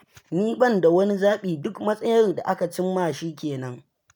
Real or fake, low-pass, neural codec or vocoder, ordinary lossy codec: real; none; none; none